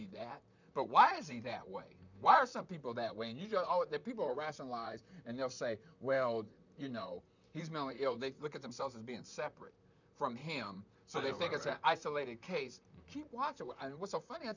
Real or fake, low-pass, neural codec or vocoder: fake; 7.2 kHz; vocoder, 44.1 kHz, 128 mel bands, Pupu-Vocoder